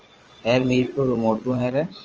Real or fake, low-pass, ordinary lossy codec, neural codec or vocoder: fake; 7.2 kHz; Opus, 24 kbps; vocoder, 22.05 kHz, 80 mel bands, Vocos